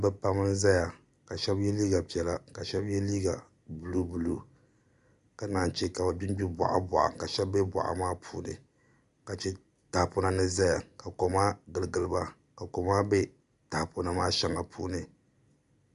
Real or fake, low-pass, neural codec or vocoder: real; 10.8 kHz; none